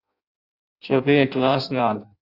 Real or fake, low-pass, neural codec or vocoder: fake; 5.4 kHz; codec, 16 kHz in and 24 kHz out, 0.6 kbps, FireRedTTS-2 codec